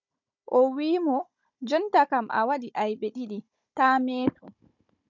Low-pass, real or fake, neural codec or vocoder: 7.2 kHz; fake; codec, 16 kHz, 16 kbps, FunCodec, trained on Chinese and English, 50 frames a second